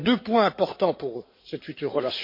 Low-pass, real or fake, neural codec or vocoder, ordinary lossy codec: 5.4 kHz; fake; codec, 16 kHz in and 24 kHz out, 2.2 kbps, FireRedTTS-2 codec; MP3, 32 kbps